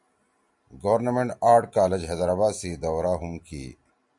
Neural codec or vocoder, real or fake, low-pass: none; real; 10.8 kHz